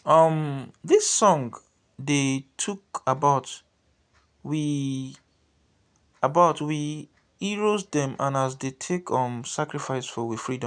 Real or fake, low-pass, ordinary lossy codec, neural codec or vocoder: real; 9.9 kHz; none; none